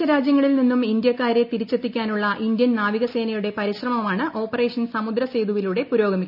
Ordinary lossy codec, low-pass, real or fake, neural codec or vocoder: none; 5.4 kHz; real; none